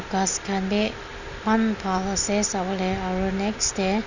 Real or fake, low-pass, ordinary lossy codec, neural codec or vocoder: real; 7.2 kHz; none; none